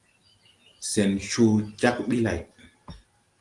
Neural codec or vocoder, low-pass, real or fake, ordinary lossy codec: none; 10.8 kHz; real; Opus, 24 kbps